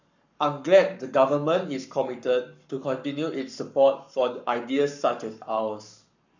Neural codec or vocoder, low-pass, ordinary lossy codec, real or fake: codec, 44.1 kHz, 7.8 kbps, Pupu-Codec; 7.2 kHz; none; fake